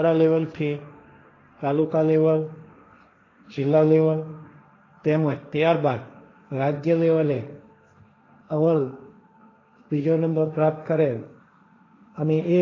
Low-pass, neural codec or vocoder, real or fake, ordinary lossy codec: 7.2 kHz; codec, 16 kHz, 1.1 kbps, Voila-Tokenizer; fake; AAC, 32 kbps